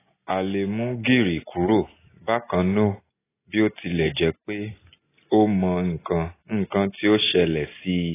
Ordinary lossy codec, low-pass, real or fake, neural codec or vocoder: AAC, 16 kbps; 3.6 kHz; real; none